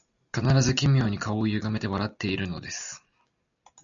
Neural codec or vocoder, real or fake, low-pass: none; real; 7.2 kHz